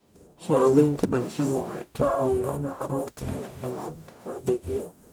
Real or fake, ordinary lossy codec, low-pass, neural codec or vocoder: fake; none; none; codec, 44.1 kHz, 0.9 kbps, DAC